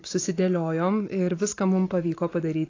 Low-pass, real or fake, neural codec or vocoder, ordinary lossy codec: 7.2 kHz; fake; vocoder, 44.1 kHz, 128 mel bands every 512 samples, BigVGAN v2; AAC, 32 kbps